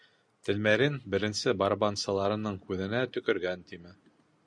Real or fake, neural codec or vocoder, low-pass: real; none; 9.9 kHz